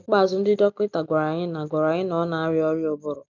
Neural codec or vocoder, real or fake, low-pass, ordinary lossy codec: none; real; 7.2 kHz; none